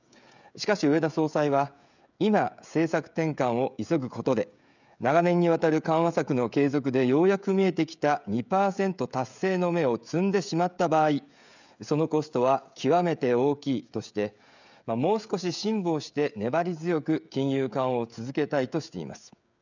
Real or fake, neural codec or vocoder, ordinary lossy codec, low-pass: fake; codec, 16 kHz, 16 kbps, FreqCodec, smaller model; none; 7.2 kHz